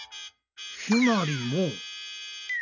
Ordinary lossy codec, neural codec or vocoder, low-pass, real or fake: none; none; 7.2 kHz; real